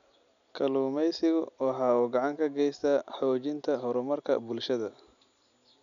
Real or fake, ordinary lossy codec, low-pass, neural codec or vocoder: real; none; 7.2 kHz; none